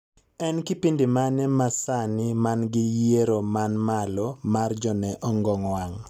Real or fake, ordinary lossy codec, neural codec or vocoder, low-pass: real; none; none; 19.8 kHz